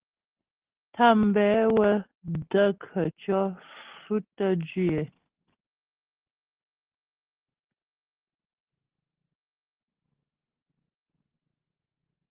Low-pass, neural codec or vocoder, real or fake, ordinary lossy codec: 3.6 kHz; none; real; Opus, 16 kbps